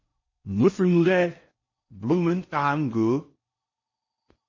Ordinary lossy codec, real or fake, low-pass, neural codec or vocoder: MP3, 32 kbps; fake; 7.2 kHz; codec, 16 kHz in and 24 kHz out, 0.6 kbps, FocalCodec, streaming, 4096 codes